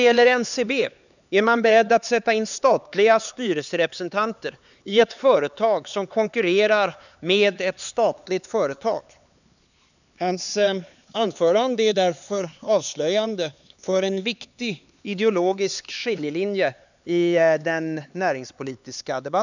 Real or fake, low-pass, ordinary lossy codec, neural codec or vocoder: fake; 7.2 kHz; none; codec, 16 kHz, 4 kbps, X-Codec, HuBERT features, trained on LibriSpeech